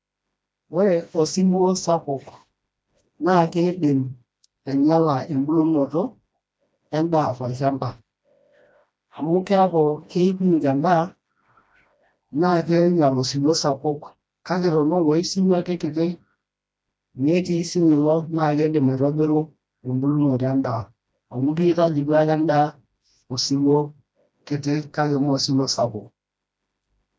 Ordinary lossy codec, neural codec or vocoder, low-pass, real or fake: none; codec, 16 kHz, 1 kbps, FreqCodec, smaller model; none; fake